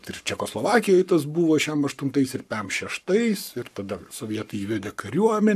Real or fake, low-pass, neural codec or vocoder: fake; 14.4 kHz; codec, 44.1 kHz, 7.8 kbps, Pupu-Codec